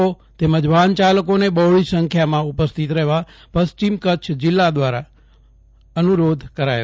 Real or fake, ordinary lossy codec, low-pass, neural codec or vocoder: real; none; none; none